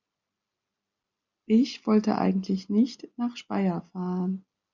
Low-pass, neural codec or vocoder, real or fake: 7.2 kHz; none; real